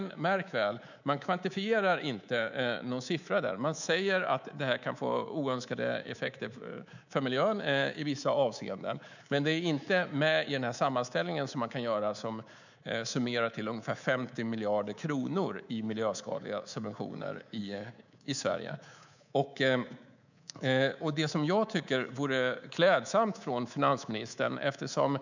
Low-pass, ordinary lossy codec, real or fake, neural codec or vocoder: 7.2 kHz; none; fake; codec, 24 kHz, 3.1 kbps, DualCodec